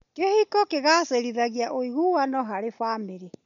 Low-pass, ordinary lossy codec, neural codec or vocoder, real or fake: 7.2 kHz; none; none; real